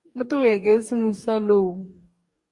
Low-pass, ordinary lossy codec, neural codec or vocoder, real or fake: 10.8 kHz; Opus, 64 kbps; codec, 44.1 kHz, 2.6 kbps, DAC; fake